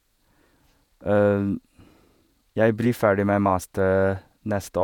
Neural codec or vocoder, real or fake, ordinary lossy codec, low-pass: vocoder, 48 kHz, 128 mel bands, Vocos; fake; none; 19.8 kHz